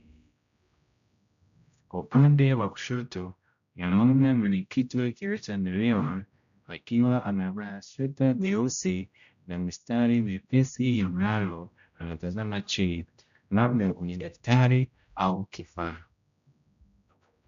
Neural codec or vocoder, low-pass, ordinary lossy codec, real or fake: codec, 16 kHz, 0.5 kbps, X-Codec, HuBERT features, trained on general audio; 7.2 kHz; AAC, 96 kbps; fake